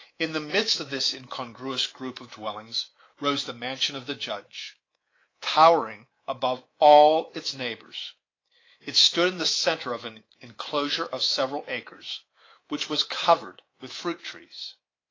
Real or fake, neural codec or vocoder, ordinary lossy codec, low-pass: fake; codec, 24 kHz, 3.1 kbps, DualCodec; AAC, 32 kbps; 7.2 kHz